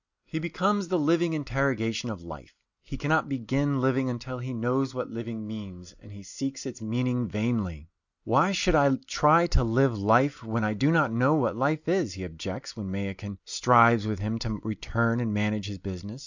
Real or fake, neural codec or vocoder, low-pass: real; none; 7.2 kHz